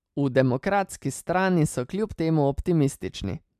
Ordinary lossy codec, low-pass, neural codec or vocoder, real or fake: MP3, 96 kbps; 14.4 kHz; none; real